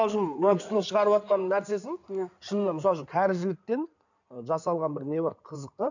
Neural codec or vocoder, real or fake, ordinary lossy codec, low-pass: codec, 16 kHz in and 24 kHz out, 2.2 kbps, FireRedTTS-2 codec; fake; none; 7.2 kHz